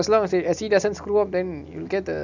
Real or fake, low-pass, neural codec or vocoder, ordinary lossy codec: real; 7.2 kHz; none; none